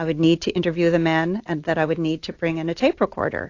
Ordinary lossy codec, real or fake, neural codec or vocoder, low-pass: AAC, 48 kbps; real; none; 7.2 kHz